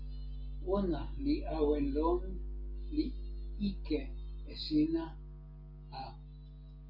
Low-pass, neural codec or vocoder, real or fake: 5.4 kHz; none; real